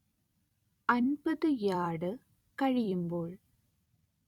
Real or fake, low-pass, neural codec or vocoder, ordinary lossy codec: fake; 19.8 kHz; vocoder, 44.1 kHz, 128 mel bands every 256 samples, BigVGAN v2; none